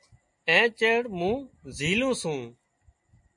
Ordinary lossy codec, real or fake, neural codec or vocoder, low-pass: MP3, 48 kbps; real; none; 10.8 kHz